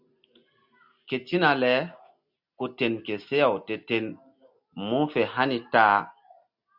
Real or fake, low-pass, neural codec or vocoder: real; 5.4 kHz; none